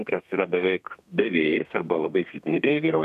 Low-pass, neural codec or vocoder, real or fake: 14.4 kHz; codec, 32 kHz, 1.9 kbps, SNAC; fake